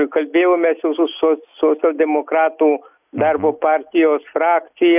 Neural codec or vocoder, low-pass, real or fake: none; 3.6 kHz; real